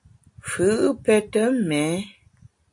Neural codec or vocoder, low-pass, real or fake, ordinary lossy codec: none; 10.8 kHz; real; MP3, 48 kbps